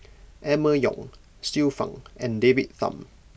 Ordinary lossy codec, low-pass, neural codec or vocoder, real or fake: none; none; none; real